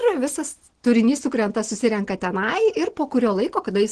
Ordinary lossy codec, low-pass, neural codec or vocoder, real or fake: Opus, 16 kbps; 9.9 kHz; none; real